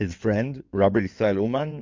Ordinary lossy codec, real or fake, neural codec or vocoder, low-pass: MP3, 64 kbps; fake; codec, 16 kHz in and 24 kHz out, 2.2 kbps, FireRedTTS-2 codec; 7.2 kHz